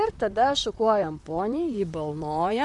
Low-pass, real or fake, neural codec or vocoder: 10.8 kHz; fake; vocoder, 44.1 kHz, 128 mel bands, Pupu-Vocoder